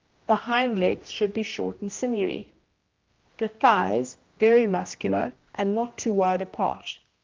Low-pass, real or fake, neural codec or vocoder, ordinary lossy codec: 7.2 kHz; fake; codec, 16 kHz, 1 kbps, X-Codec, HuBERT features, trained on general audio; Opus, 24 kbps